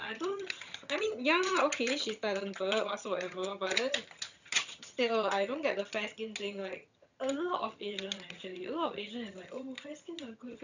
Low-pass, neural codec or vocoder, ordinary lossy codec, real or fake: 7.2 kHz; vocoder, 22.05 kHz, 80 mel bands, HiFi-GAN; none; fake